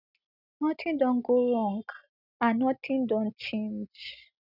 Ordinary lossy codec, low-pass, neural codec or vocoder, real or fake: none; 5.4 kHz; none; real